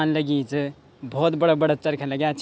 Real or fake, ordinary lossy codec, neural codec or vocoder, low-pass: real; none; none; none